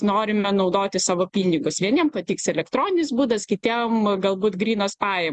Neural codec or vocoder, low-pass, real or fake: none; 10.8 kHz; real